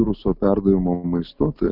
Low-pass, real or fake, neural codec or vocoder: 5.4 kHz; real; none